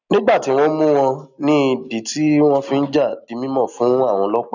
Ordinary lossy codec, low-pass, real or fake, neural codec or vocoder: none; 7.2 kHz; real; none